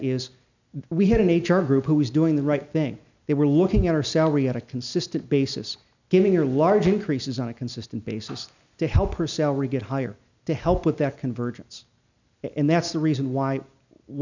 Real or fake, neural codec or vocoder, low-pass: real; none; 7.2 kHz